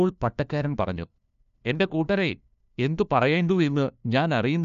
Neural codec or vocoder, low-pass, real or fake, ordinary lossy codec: codec, 16 kHz, 1 kbps, FunCodec, trained on LibriTTS, 50 frames a second; 7.2 kHz; fake; none